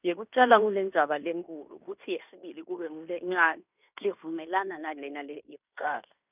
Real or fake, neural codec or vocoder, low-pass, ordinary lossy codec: fake; codec, 16 kHz in and 24 kHz out, 0.9 kbps, LongCat-Audio-Codec, fine tuned four codebook decoder; 3.6 kHz; none